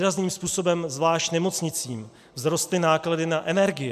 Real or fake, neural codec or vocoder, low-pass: real; none; 14.4 kHz